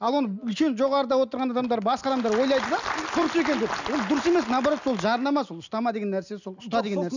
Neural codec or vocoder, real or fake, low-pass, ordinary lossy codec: none; real; 7.2 kHz; none